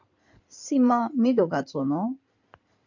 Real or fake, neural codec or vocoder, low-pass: fake; codec, 16 kHz in and 24 kHz out, 2.2 kbps, FireRedTTS-2 codec; 7.2 kHz